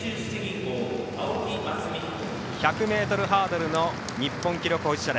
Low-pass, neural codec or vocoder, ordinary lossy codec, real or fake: none; none; none; real